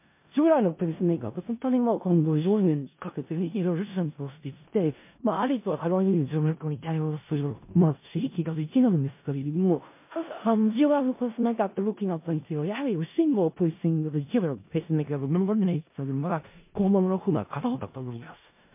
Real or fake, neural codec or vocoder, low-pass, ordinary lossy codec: fake; codec, 16 kHz in and 24 kHz out, 0.4 kbps, LongCat-Audio-Codec, four codebook decoder; 3.6 kHz; MP3, 24 kbps